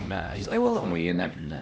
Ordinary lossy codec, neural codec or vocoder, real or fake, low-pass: none; codec, 16 kHz, 1 kbps, X-Codec, HuBERT features, trained on LibriSpeech; fake; none